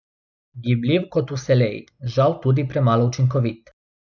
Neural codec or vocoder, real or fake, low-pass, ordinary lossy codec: none; real; 7.2 kHz; none